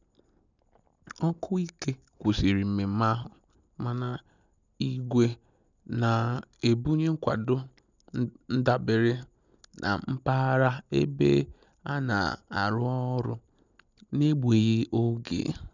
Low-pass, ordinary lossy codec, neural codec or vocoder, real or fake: 7.2 kHz; none; none; real